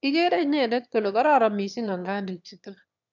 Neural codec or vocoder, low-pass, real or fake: autoencoder, 22.05 kHz, a latent of 192 numbers a frame, VITS, trained on one speaker; 7.2 kHz; fake